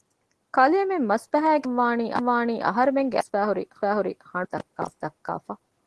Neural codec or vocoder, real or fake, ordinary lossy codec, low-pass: none; real; Opus, 16 kbps; 10.8 kHz